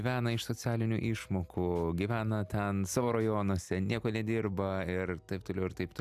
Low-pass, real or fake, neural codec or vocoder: 14.4 kHz; real; none